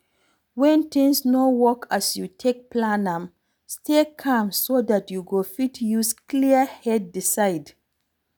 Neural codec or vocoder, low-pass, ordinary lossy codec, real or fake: none; none; none; real